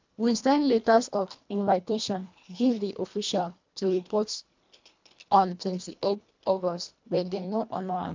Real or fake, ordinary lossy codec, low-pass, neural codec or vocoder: fake; MP3, 64 kbps; 7.2 kHz; codec, 24 kHz, 1.5 kbps, HILCodec